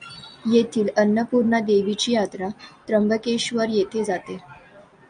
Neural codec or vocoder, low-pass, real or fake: none; 9.9 kHz; real